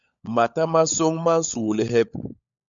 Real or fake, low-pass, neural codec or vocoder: fake; 7.2 kHz; codec, 16 kHz, 16 kbps, FunCodec, trained on LibriTTS, 50 frames a second